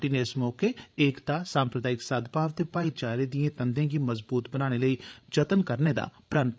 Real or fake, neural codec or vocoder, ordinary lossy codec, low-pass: fake; codec, 16 kHz, 8 kbps, FreqCodec, larger model; none; none